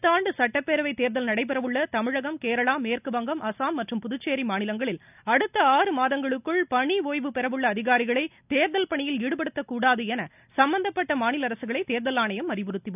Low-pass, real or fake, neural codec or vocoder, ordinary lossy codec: 3.6 kHz; real; none; none